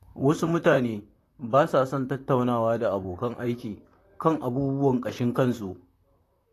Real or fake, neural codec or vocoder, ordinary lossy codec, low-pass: fake; vocoder, 44.1 kHz, 128 mel bands, Pupu-Vocoder; AAC, 48 kbps; 14.4 kHz